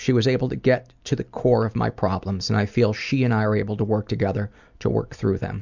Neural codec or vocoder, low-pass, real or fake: none; 7.2 kHz; real